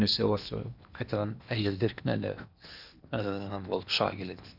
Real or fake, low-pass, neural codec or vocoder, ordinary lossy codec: fake; 5.4 kHz; codec, 16 kHz in and 24 kHz out, 0.8 kbps, FocalCodec, streaming, 65536 codes; none